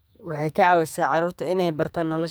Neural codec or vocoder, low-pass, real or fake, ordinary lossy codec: codec, 44.1 kHz, 2.6 kbps, SNAC; none; fake; none